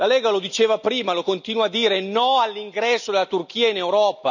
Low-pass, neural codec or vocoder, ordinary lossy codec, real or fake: 7.2 kHz; none; none; real